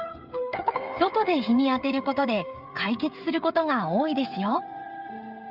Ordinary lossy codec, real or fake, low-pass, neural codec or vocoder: Opus, 64 kbps; fake; 5.4 kHz; codec, 16 kHz, 8 kbps, FreqCodec, smaller model